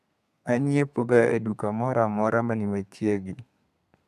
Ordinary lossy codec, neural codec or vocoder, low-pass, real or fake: none; codec, 32 kHz, 1.9 kbps, SNAC; 14.4 kHz; fake